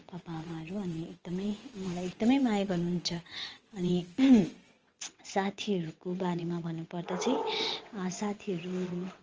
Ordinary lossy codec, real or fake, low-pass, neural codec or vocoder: Opus, 24 kbps; fake; 7.2 kHz; vocoder, 22.05 kHz, 80 mel bands, WaveNeXt